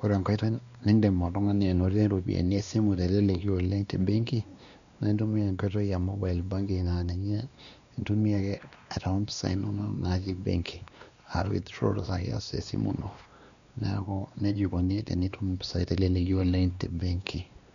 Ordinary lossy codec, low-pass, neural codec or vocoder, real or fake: none; 7.2 kHz; codec, 16 kHz, 2 kbps, X-Codec, WavLM features, trained on Multilingual LibriSpeech; fake